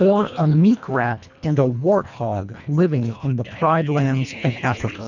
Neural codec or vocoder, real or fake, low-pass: codec, 24 kHz, 1.5 kbps, HILCodec; fake; 7.2 kHz